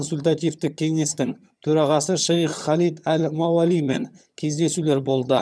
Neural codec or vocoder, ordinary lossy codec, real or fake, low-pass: vocoder, 22.05 kHz, 80 mel bands, HiFi-GAN; none; fake; none